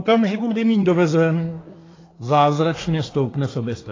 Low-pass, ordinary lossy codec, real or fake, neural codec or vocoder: 7.2 kHz; AAC, 32 kbps; fake; codec, 24 kHz, 1 kbps, SNAC